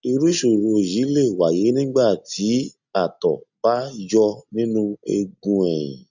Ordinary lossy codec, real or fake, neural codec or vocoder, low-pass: none; real; none; 7.2 kHz